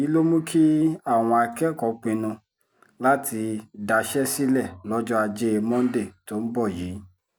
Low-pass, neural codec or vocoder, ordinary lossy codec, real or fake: none; none; none; real